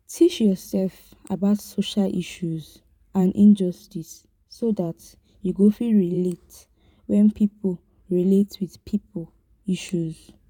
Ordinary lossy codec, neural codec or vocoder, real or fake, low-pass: none; vocoder, 44.1 kHz, 128 mel bands every 512 samples, BigVGAN v2; fake; 19.8 kHz